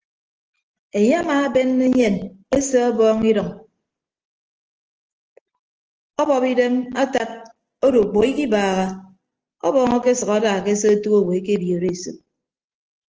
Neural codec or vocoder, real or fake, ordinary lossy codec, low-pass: none; real; Opus, 16 kbps; 7.2 kHz